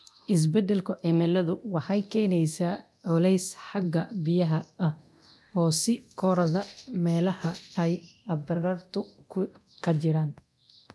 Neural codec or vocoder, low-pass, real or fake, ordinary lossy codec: codec, 24 kHz, 0.9 kbps, DualCodec; none; fake; none